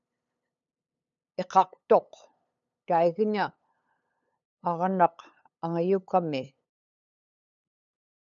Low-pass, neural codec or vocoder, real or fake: 7.2 kHz; codec, 16 kHz, 8 kbps, FunCodec, trained on LibriTTS, 25 frames a second; fake